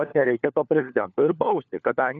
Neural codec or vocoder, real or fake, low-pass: codec, 16 kHz, 4 kbps, FunCodec, trained on LibriTTS, 50 frames a second; fake; 7.2 kHz